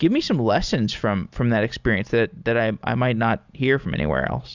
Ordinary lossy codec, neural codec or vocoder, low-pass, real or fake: Opus, 64 kbps; none; 7.2 kHz; real